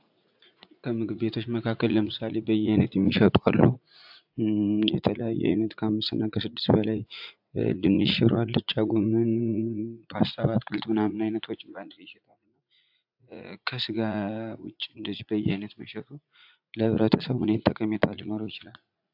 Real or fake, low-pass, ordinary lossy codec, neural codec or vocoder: fake; 5.4 kHz; AAC, 48 kbps; vocoder, 44.1 kHz, 80 mel bands, Vocos